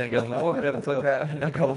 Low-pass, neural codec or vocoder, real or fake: 10.8 kHz; codec, 24 kHz, 1.5 kbps, HILCodec; fake